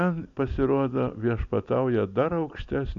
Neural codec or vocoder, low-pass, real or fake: none; 7.2 kHz; real